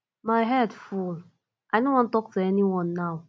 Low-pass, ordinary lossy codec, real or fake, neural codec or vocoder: none; none; real; none